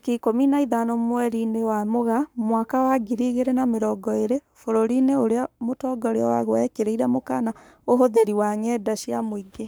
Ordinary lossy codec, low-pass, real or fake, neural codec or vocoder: none; none; fake; codec, 44.1 kHz, 7.8 kbps, Pupu-Codec